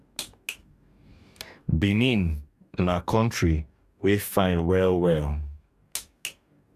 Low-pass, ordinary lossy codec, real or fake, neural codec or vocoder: 14.4 kHz; none; fake; codec, 44.1 kHz, 2.6 kbps, DAC